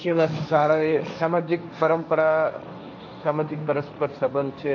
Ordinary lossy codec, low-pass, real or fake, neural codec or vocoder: MP3, 64 kbps; 7.2 kHz; fake; codec, 16 kHz, 1.1 kbps, Voila-Tokenizer